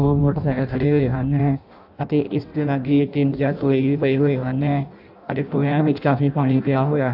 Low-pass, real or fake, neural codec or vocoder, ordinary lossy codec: 5.4 kHz; fake; codec, 16 kHz in and 24 kHz out, 0.6 kbps, FireRedTTS-2 codec; AAC, 48 kbps